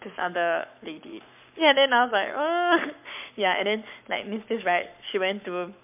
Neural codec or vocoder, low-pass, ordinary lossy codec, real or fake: codec, 44.1 kHz, 7.8 kbps, Pupu-Codec; 3.6 kHz; MP3, 32 kbps; fake